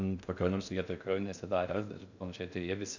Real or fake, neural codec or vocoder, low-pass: fake; codec, 16 kHz in and 24 kHz out, 0.6 kbps, FocalCodec, streaming, 2048 codes; 7.2 kHz